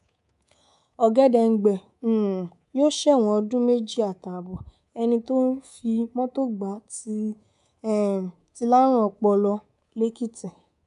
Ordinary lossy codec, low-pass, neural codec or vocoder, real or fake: AAC, 96 kbps; 10.8 kHz; codec, 24 kHz, 3.1 kbps, DualCodec; fake